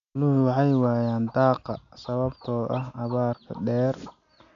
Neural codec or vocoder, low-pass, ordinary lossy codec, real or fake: none; 7.2 kHz; AAC, 96 kbps; real